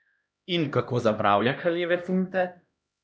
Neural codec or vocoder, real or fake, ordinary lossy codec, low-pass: codec, 16 kHz, 1 kbps, X-Codec, HuBERT features, trained on LibriSpeech; fake; none; none